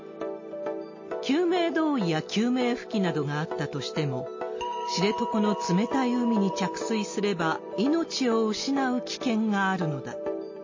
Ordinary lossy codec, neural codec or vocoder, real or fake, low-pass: MP3, 32 kbps; none; real; 7.2 kHz